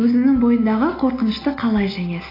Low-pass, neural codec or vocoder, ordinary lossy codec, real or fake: 5.4 kHz; none; AAC, 24 kbps; real